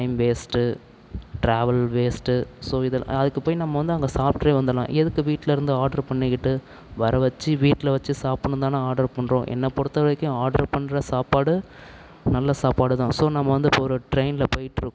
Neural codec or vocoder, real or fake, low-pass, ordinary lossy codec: none; real; none; none